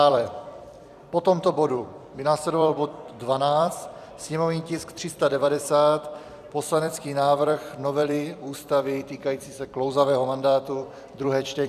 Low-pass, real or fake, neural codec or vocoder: 14.4 kHz; fake; vocoder, 44.1 kHz, 128 mel bands every 256 samples, BigVGAN v2